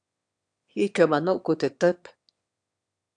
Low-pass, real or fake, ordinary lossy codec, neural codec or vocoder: 9.9 kHz; fake; AAC, 64 kbps; autoencoder, 22.05 kHz, a latent of 192 numbers a frame, VITS, trained on one speaker